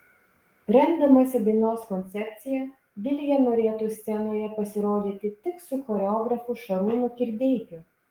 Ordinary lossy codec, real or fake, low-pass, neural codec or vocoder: Opus, 24 kbps; fake; 19.8 kHz; codec, 44.1 kHz, 7.8 kbps, DAC